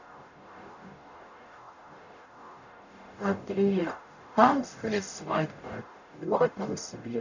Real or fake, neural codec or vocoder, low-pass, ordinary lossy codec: fake; codec, 44.1 kHz, 0.9 kbps, DAC; 7.2 kHz; none